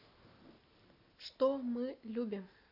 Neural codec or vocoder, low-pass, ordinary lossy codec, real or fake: none; 5.4 kHz; AAC, 48 kbps; real